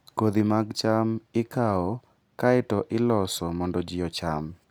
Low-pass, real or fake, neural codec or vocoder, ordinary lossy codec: none; real; none; none